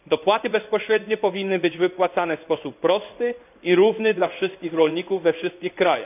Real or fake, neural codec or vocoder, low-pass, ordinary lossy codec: fake; codec, 16 kHz in and 24 kHz out, 1 kbps, XY-Tokenizer; 3.6 kHz; none